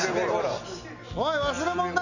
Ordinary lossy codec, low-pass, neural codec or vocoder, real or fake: none; 7.2 kHz; none; real